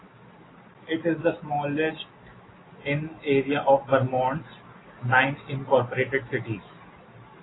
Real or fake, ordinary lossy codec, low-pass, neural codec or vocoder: real; AAC, 16 kbps; 7.2 kHz; none